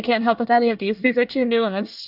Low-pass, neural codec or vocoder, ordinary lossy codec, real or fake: 5.4 kHz; codec, 24 kHz, 1 kbps, SNAC; AAC, 48 kbps; fake